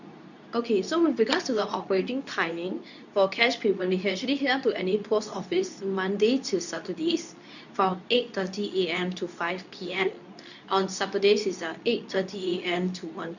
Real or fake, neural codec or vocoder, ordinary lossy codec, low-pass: fake; codec, 24 kHz, 0.9 kbps, WavTokenizer, medium speech release version 2; none; 7.2 kHz